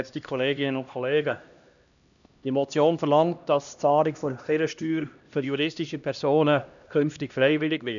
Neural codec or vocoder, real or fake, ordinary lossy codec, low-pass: codec, 16 kHz, 1 kbps, X-Codec, HuBERT features, trained on LibriSpeech; fake; none; 7.2 kHz